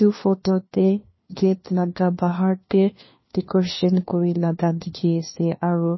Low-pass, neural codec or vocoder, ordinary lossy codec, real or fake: 7.2 kHz; codec, 16 kHz, 1 kbps, FunCodec, trained on LibriTTS, 50 frames a second; MP3, 24 kbps; fake